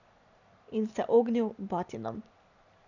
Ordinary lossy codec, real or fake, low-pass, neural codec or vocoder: none; real; 7.2 kHz; none